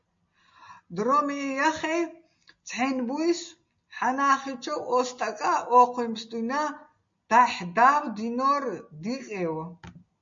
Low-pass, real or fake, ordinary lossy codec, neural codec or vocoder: 7.2 kHz; real; MP3, 48 kbps; none